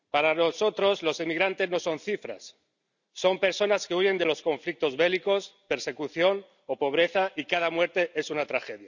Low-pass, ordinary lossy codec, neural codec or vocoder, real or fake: 7.2 kHz; none; none; real